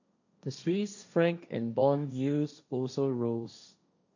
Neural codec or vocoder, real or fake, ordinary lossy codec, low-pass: codec, 16 kHz, 1.1 kbps, Voila-Tokenizer; fake; none; 7.2 kHz